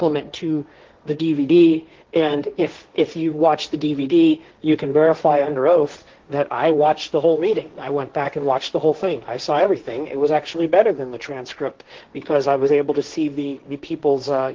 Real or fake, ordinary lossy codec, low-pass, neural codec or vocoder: fake; Opus, 16 kbps; 7.2 kHz; codec, 16 kHz, 1.1 kbps, Voila-Tokenizer